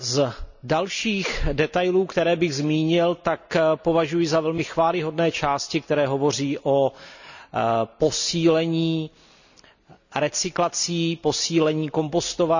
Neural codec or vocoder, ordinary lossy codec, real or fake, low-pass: none; none; real; 7.2 kHz